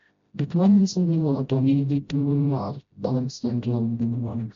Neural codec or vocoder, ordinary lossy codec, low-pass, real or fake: codec, 16 kHz, 0.5 kbps, FreqCodec, smaller model; MP3, 48 kbps; 7.2 kHz; fake